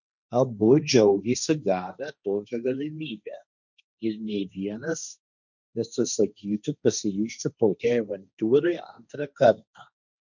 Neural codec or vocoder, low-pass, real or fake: codec, 16 kHz, 1.1 kbps, Voila-Tokenizer; 7.2 kHz; fake